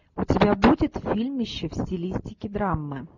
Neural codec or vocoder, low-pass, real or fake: none; 7.2 kHz; real